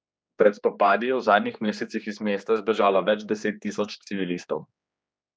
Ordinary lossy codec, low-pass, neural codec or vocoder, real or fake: none; none; codec, 16 kHz, 2 kbps, X-Codec, HuBERT features, trained on general audio; fake